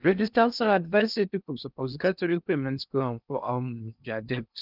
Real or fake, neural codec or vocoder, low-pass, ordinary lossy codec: fake; codec, 16 kHz in and 24 kHz out, 0.6 kbps, FocalCodec, streaming, 2048 codes; 5.4 kHz; none